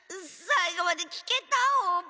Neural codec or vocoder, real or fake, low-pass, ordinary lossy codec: none; real; none; none